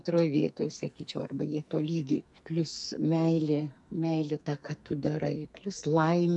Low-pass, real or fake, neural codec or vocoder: 10.8 kHz; fake; codec, 44.1 kHz, 2.6 kbps, SNAC